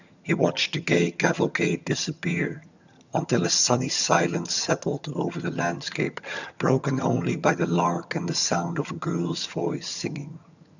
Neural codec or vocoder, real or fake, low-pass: vocoder, 22.05 kHz, 80 mel bands, HiFi-GAN; fake; 7.2 kHz